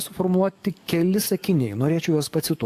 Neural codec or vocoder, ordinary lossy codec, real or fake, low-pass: codec, 44.1 kHz, 7.8 kbps, DAC; AAC, 64 kbps; fake; 14.4 kHz